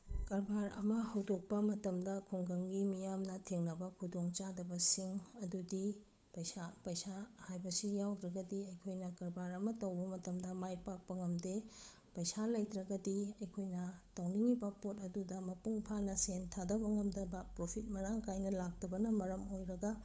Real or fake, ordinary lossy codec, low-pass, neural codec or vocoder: fake; none; none; codec, 16 kHz, 8 kbps, FunCodec, trained on Chinese and English, 25 frames a second